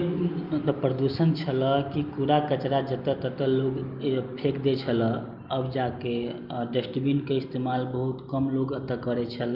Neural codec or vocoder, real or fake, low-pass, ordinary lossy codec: none; real; 5.4 kHz; Opus, 24 kbps